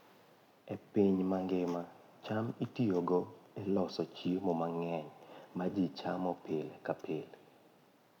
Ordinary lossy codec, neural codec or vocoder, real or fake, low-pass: none; none; real; 19.8 kHz